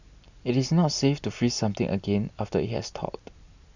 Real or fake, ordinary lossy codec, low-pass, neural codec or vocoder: real; none; 7.2 kHz; none